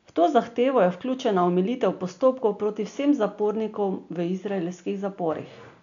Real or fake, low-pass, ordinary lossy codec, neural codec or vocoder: real; 7.2 kHz; none; none